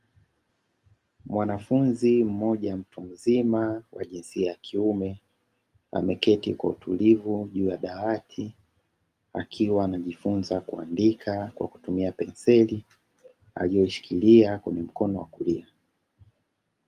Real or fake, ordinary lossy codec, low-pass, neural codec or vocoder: real; Opus, 32 kbps; 14.4 kHz; none